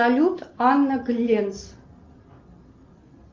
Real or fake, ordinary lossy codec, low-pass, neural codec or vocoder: real; Opus, 24 kbps; 7.2 kHz; none